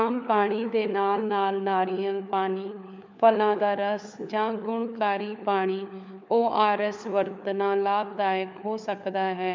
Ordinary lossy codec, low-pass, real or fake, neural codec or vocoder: MP3, 64 kbps; 7.2 kHz; fake; codec, 16 kHz, 4 kbps, FunCodec, trained on LibriTTS, 50 frames a second